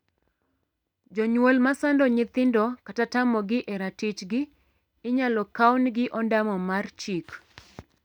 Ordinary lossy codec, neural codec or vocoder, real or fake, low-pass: none; none; real; 19.8 kHz